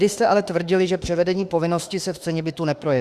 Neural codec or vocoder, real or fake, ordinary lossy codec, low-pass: autoencoder, 48 kHz, 32 numbers a frame, DAC-VAE, trained on Japanese speech; fake; Opus, 64 kbps; 14.4 kHz